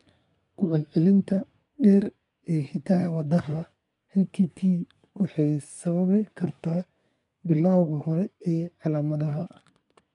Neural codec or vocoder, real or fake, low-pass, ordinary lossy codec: codec, 24 kHz, 1 kbps, SNAC; fake; 10.8 kHz; MP3, 96 kbps